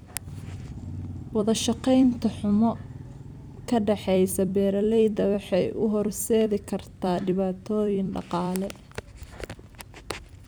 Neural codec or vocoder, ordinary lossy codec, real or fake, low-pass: vocoder, 44.1 kHz, 128 mel bands every 256 samples, BigVGAN v2; none; fake; none